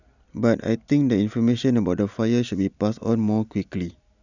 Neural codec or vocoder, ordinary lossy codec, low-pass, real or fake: none; none; 7.2 kHz; real